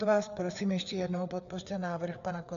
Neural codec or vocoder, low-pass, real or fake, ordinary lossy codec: codec, 16 kHz, 4 kbps, FreqCodec, larger model; 7.2 kHz; fake; MP3, 96 kbps